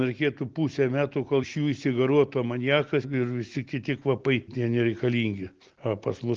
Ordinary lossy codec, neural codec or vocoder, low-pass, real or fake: Opus, 16 kbps; none; 7.2 kHz; real